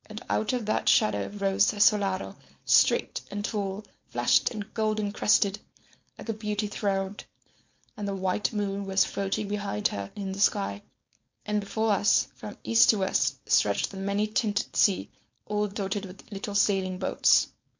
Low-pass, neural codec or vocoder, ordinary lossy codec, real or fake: 7.2 kHz; codec, 16 kHz, 4.8 kbps, FACodec; MP3, 48 kbps; fake